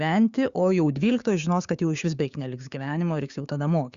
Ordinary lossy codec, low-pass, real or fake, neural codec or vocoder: Opus, 64 kbps; 7.2 kHz; fake; codec, 16 kHz, 6 kbps, DAC